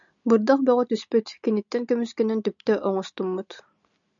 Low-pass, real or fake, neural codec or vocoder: 7.2 kHz; real; none